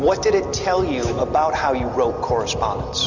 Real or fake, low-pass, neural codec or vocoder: real; 7.2 kHz; none